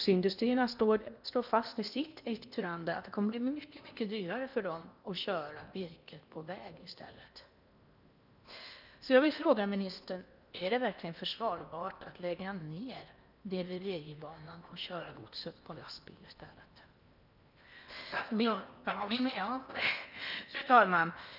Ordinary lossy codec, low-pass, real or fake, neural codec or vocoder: none; 5.4 kHz; fake; codec, 16 kHz in and 24 kHz out, 0.8 kbps, FocalCodec, streaming, 65536 codes